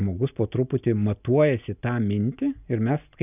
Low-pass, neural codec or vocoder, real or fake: 3.6 kHz; none; real